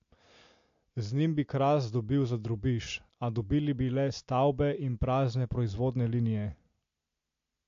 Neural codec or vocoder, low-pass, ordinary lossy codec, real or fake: none; 7.2 kHz; AAC, 48 kbps; real